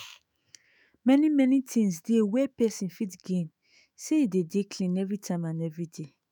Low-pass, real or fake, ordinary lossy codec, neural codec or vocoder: none; fake; none; autoencoder, 48 kHz, 128 numbers a frame, DAC-VAE, trained on Japanese speech